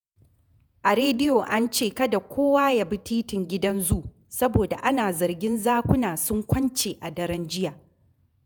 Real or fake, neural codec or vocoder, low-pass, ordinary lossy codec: fake; vocoder, 48 kHz, 128 mel bands, Vocos; none; none